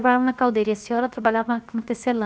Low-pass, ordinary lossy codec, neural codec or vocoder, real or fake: none; none; codec, 16 kHz, 0.7 kbps, FocalCodec; fake